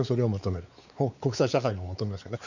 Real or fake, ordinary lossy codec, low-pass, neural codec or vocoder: fake; none; 7.2 kHz; codec, 16 kHz, 4 kbps, X-Codec, WavLM features, trained on Multilingual LibriSpeech